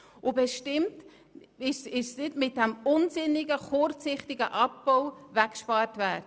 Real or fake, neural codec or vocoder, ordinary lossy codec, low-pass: real; none; none; none